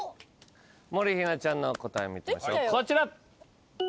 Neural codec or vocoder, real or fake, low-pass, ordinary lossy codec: none; real; none; none